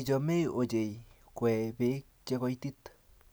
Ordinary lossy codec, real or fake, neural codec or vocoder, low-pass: none; real; none; none